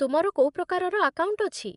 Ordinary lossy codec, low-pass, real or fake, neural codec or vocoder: none; 10.8 kHz; fake; vocoder, 24 kHz, 100 mel bands, Vocos